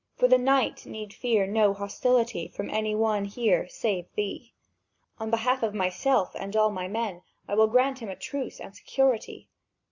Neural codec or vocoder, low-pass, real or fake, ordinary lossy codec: none; 7.2 kHz; real; Opus, 64 kbps